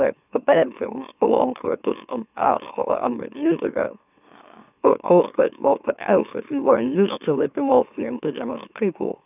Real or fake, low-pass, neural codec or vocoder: fake; 3.6 kHz; autoencoder, 44.1 kHz, a latent of 192 numbers a frame, MeloTTS